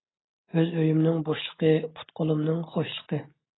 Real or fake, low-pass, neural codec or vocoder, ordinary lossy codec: real; 7.2 kHz; none; AAC, 16 kbps